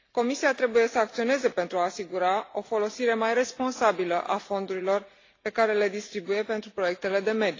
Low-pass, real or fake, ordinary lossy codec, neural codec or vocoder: 7.2 kHz; real; AAC, 32 kbps; none